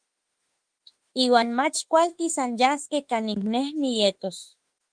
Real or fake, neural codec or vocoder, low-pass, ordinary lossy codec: fake; autoencoder, 48 kHz, 32 numbers a frame, DAC-VAE, trained on Japanese speech; 9.9 kHz; Opus, 24 kbps